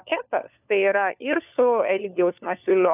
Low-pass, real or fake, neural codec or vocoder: 3.6 kHz; fake; codec, 16 kHz, 4 kbps, FunCodec, trained on LibriTTS, 50 frames a second